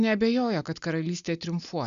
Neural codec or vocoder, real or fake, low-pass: none; real; 7.2 kHz